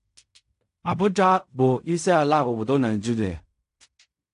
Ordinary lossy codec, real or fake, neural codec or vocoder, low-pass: AAC, 64 kbps; fake; codec, 16 kHz in and 24 kHz out, 0.4 kbps, LongCat-Audio-Codec, fine tuned four codebook decoder; 10.8 kHz